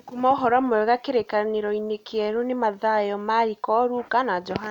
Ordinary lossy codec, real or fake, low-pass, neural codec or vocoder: none; real; 19.8 kHz; none